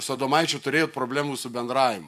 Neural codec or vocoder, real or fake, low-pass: none; real; 14.4 kHz